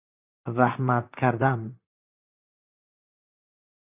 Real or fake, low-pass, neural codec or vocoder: fake; 3.6 kHz; vocoder, 44.1 kHz, 128 mel bands, Pupu-Vocoder